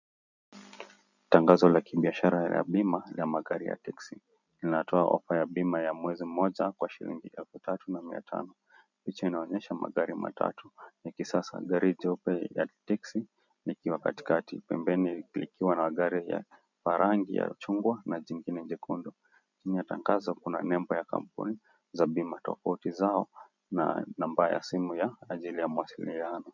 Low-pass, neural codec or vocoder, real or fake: 7.2 kHz; none; real